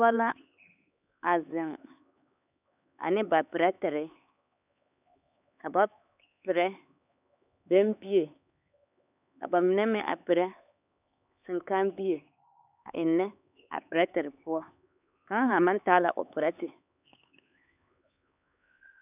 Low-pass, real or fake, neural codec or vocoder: 3.6 kHz; fake; codec, 16 kHz, 4 kbps, X-Codec, HuBERT features, trained on LibriSpeech